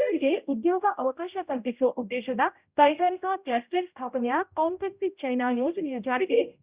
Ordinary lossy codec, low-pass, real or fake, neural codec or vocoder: Opus, 64 kbps; 3.6 kHz; fake; codec, 16 kHz, 0.5 kbps, X-Codec, HuBERT features, trained on general audio